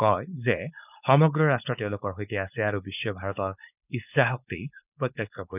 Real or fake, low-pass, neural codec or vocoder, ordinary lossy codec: fake; 3.6 kHz; codec, 16 kHz, 4.8 kbps, FACodec; none